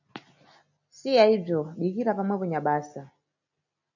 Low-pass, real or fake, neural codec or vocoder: 7.2 kHz; real; none